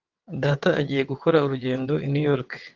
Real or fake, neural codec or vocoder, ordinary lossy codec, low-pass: fake; vocoder, 22.05 kHz, 80 mel bands, WaveNeXt; Opus, 32 kbps; 7.2 kHz